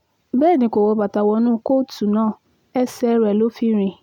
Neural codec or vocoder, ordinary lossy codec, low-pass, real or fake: none; none; 19.8 kHz; real